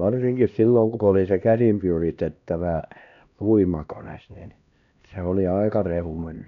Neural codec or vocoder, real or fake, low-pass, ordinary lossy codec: codec, 16 kHz, 1 kbps, X-Codec, HuBERT features, trained on LibriSpeech; fake; 7.2 kHz; none